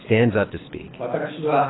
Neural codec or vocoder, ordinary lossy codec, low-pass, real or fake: codec, 24 kHz, 6 kbps, HILCodec; AAC, 16 kbps; 7.2 kHz; fake